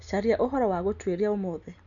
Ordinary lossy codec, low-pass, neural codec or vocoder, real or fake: none; 7.2 kHz; none; real